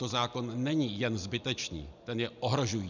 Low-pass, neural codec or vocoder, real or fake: 7.2 kHz; none; real